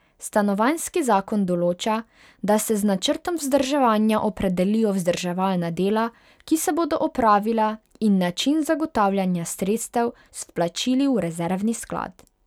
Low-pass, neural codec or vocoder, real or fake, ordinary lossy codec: 19.8 kHz; none; real; none